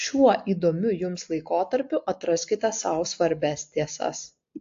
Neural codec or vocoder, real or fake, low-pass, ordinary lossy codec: none; real; 7.2 kHz; AAC, 48 kbps